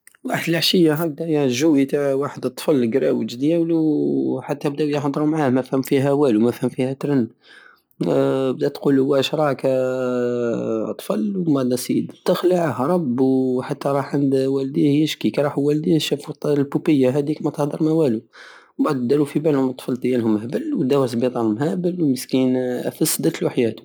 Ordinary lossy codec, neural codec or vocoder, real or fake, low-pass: none; none; real; none